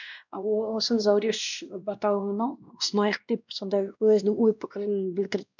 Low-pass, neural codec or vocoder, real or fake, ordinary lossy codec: 7.2 kHz; codec, 16 kHz, 1 kbps, X-Codec, WavLM features, trained on Multilingual LibriSpeech; fake; none